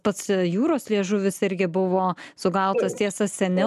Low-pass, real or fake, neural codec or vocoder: 14.4 kHz; real; none